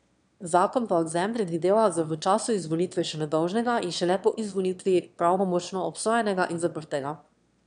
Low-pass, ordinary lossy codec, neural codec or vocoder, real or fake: 9.9 kHz; none; autoencoder, 22.05 kHz, a latent of 192 numbers a frame, VITS, trained on one speaker; fake